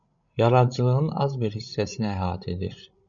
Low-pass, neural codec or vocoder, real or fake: 7.2 kHz; codec, 16 kHz, 16 kbps, FreqCodec, larger model; fake